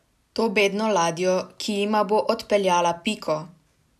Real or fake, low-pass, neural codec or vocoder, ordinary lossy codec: real; 14.4 kHz; none; none